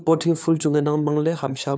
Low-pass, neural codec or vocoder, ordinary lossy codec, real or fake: none; codec, 16 kHz, 4 kbps, FunCodec, trained on LibriTTS, 50 frames a second; none; fake